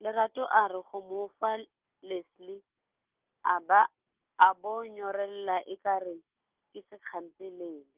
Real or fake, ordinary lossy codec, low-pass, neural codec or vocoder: real; Opus, 24 kbps; 3.6 kHz; none